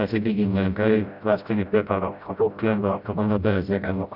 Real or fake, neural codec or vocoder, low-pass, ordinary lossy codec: fake; codec, 16 kHz, 0.5 kbps, FreqCodec, smaller model; 5.4 kHz; none